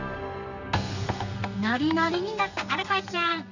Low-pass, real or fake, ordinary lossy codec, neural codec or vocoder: 7.2 kHz; fake; none; codec, 32 kHz, 1.9 kbps, SNAC